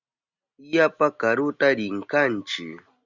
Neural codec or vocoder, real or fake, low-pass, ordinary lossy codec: none; real; 7.2 kHz; Opus, 64 kbps